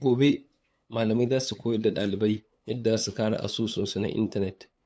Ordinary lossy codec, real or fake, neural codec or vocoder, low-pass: none; fake; codec, 16 kHz, 8 kbps, FunCodec, trained on LibriTTS, 25 frames a second; none